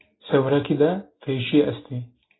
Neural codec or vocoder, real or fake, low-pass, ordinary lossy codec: none; real; 7.2 kHz; AAC, 16 kbps